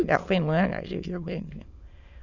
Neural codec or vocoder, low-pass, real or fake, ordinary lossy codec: autoencoder, 22.05 kHz, a latent of 192 numbers a frame, VITS, trained on many speakers; 7.2 kHz; fake; none